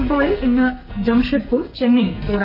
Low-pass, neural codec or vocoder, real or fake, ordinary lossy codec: 5.4 kHz; codec, 44.1 kHz, 2.6 kbps, SNAC; fake; none